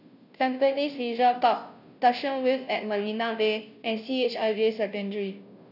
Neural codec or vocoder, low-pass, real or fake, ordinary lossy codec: codec, 16 kHz, 0.5 kbps, FunCodec, trained on Chinese and English, 25 frames a second; 5.4 kHz; fake; none